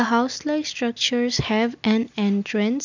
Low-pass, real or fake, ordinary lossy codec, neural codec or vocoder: 7.2 kHz; real; none; none